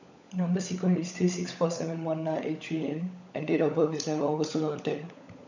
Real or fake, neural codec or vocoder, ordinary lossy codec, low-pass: fake; codec, 16 kHz, 16 kbps, FunCodec, trained on LibriTTS, 50 frames a second; none; 7.2 kHz